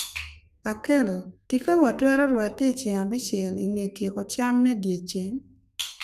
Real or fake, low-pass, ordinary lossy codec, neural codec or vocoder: fake; 14.4 kHz; none; codec, 32 kHz, 1.9 kbps, SNAC